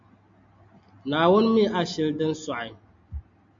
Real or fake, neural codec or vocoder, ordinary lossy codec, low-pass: real; none; MP3, 48 kbps; 7.2 kHz